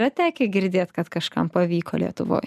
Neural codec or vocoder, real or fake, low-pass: none; real; 14.4 kHz